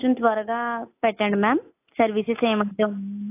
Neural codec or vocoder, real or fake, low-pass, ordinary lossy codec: none; real; 3.6 kHz; AAC, 24 kbps